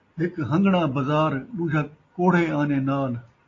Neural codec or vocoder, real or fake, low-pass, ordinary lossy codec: none; real; 7.2 kHz; AAC, 32 kbps